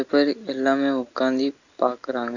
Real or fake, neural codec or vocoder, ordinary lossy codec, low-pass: real; none; none; 7.2 kHz